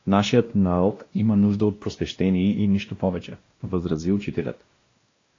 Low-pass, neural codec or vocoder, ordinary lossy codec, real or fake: 7.2 kHz; codec, 16 kHz, 1 kbps, X-Codec, WavLM features, trained on Multilingual LibriSpeech; AAC, 32 kbps; fake